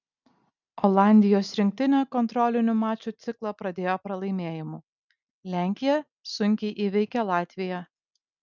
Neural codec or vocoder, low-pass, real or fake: none; 7.2 kHz; real